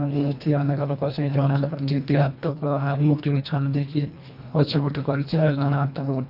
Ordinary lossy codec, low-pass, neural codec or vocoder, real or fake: none; 5.4 kHz; codec, 24 kHz, 1.5 kbps, HILCodec; fake